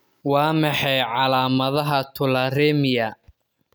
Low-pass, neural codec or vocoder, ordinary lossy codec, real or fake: none; none; none; real